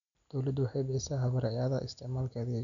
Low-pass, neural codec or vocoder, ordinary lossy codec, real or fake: 7.2 kHz; none; none; real